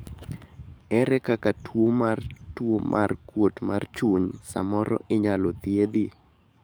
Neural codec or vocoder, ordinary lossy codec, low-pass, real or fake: codec, 44.1 kHz, 7.8 kbps, DAC; none; none; fake